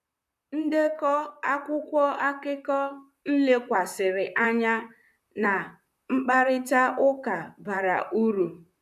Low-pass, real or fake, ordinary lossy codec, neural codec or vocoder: 14.4 kHz; fake; none; vocoder, 44.1 kHz, 128 mel bands, Pupu-Vocoder